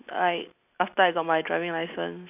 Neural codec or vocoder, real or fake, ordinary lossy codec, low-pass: none; real; none; 3.6 kHz